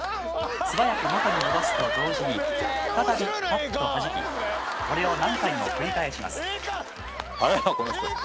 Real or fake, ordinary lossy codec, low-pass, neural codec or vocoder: real; none; none; none